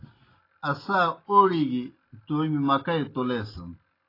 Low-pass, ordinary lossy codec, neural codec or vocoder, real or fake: 5.4 kHz; AAC, 24 kbps; none; real